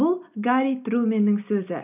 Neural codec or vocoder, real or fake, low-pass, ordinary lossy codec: none; real; 3.6 kHz; none